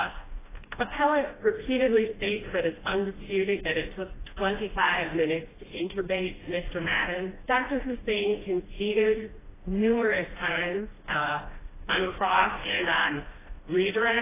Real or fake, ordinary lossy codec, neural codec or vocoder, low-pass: fake; AAC, 16 kbps; codec, 16 kHz, 1 kbps, FreqCodec, smaller model; 3.6 kHz